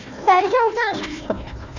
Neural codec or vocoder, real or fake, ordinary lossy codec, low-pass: codec, 16 kHz, 1 kbps, FunCodec, trained on Chinese and English, 50 frames a second; fake; none; 7.2 kHz